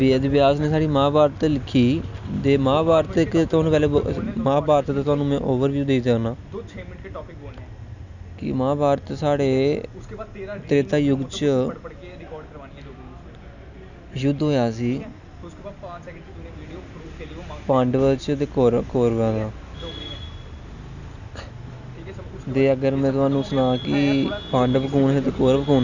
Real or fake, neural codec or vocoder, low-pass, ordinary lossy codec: real; none; 7.2 kHz; none